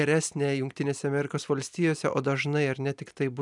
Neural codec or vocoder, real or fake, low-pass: none; real; 10.8 kHz